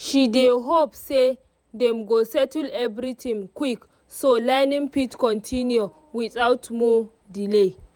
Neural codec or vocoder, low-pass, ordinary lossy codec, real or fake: vocoder, 48 kHz, 128 mel bands, Vocos; 19.8 kHz; none; fake